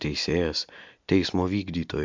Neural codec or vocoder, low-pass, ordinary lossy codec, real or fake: none; 7.2 kHz; MP3, 64 kbps; real